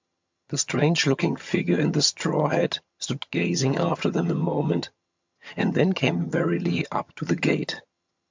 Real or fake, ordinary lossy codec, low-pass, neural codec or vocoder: fake; MP3, 64 kbps; 7.2 kHz; vocoder, 22.05 kHz, 80 mel bands, HiFi-GAN